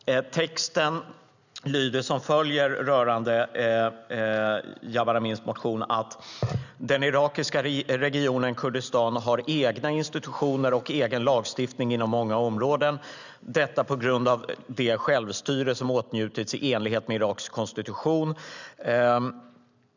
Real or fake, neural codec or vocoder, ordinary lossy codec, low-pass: real; none; none; 7.2 kHz